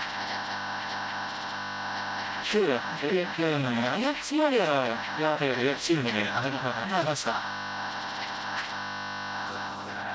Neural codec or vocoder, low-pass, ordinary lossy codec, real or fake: codec, 16 kHz, 0.5 kbps, FreqCodec, smaller model; none; none; fake